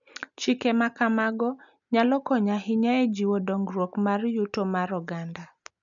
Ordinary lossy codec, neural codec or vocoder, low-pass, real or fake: none; none; 7.2 kHz; real